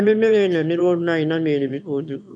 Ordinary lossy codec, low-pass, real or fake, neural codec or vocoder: none; 9.9 kHz; fake; autoencoder, 22.05 kHz, a latent of 192 numbers a frame, VITS, trained on one speaker